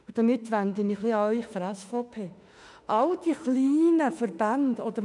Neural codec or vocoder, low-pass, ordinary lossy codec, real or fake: autoencoder, 48 kHz, 32 numbers a frame, DAC-VAE, trained on Japanese speech; 10.8 kHz; none; fake